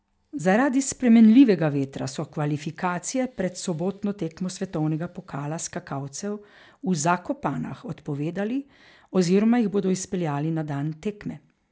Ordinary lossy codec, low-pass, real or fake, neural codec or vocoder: none; none; real; none